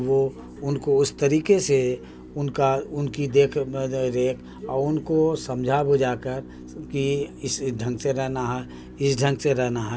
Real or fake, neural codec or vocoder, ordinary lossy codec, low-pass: real; none; none; none